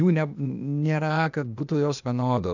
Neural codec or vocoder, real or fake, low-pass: codec, 16 kHz, 0.8 kbps, ZipCodec; fake; 7.2 kHz